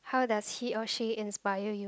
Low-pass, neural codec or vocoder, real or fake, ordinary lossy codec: none; none; real; none